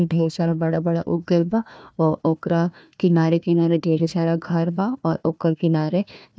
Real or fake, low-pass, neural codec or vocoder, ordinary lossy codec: fake; none; codec, 16 kHz, 1 kbps, FunCodec, trained on Chinese and English, 50 frames a second; none